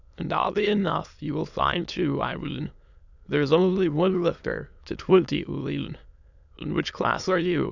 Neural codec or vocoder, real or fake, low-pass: autoencoder, 22.05 kHz, a latent of 192 numbers a frame, VITS, trained on many speakers; fake; 7.2 kHz